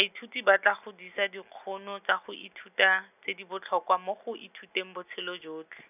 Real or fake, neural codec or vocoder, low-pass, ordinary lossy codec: real; none; 3.6 kHz; none